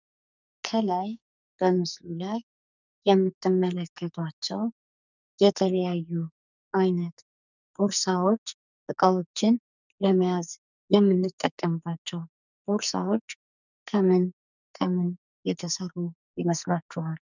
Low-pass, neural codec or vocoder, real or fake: 7.2 kHz; codec, 44.1 kHz, 2.6 kbps, SNAC; fake